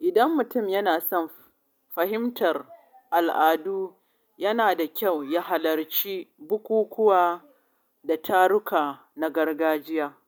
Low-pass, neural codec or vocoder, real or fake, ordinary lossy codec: none; none; real; none